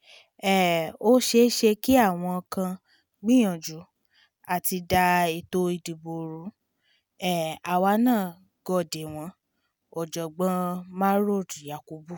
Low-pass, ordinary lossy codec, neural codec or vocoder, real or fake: none; none; none; real